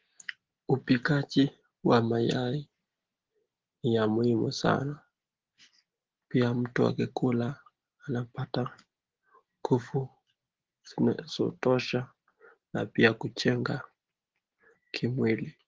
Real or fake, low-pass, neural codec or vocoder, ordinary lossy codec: real; 7.2 kHz; none; Opus, 16 kbps